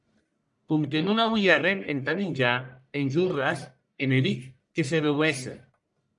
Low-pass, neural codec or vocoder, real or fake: 10.8 kHz; codec, 44.1 kHz, 1.7 kbps, Pupu-Codec; fake